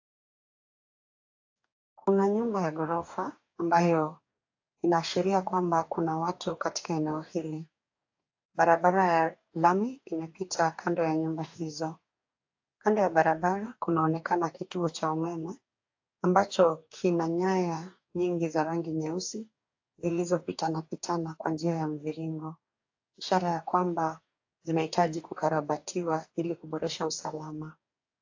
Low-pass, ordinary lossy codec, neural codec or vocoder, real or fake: 7.2 kHz; AAC, 48 kbps; codec, 44.1 kHz, 2.6 kbps, DAC; fake